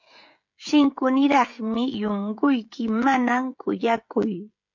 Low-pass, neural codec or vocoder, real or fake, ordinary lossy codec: 7.2 kHz; codec, 16 kHz, 16 kbps, FreqCodec, smaller model; fake; MP3, 48 kbps